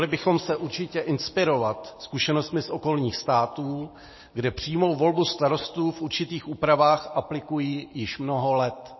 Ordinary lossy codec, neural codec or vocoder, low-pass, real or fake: MP3, 24 kbps; none; 7.2 kHz; real